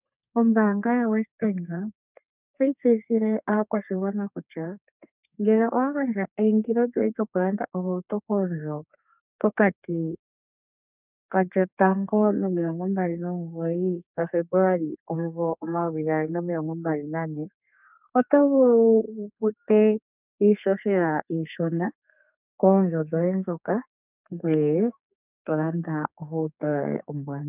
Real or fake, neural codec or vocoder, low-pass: fake; codec, 32 kHz, 1.9 kbps, SNAC; 3.6 kHz